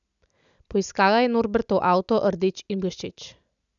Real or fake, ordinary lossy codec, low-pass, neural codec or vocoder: real; none; 7.2 kHz; none